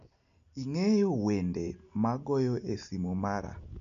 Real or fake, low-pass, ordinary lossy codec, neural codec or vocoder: real; 7.2 kHz; none; none